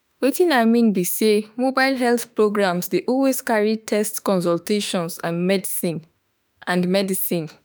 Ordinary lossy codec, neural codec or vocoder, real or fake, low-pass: none; autoencoder, 48 kHz, 32 numbers a frame, DAC-VAE, trained on Japanese speech; fake; none